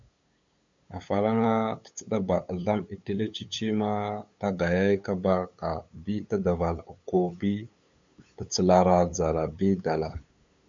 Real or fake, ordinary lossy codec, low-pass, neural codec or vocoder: fake; MP3, 64 kbps; 7.2 kHz; codec, 16 kHz, 8 kbps, FunCodec, trained on LibriTTS, 25 frames a second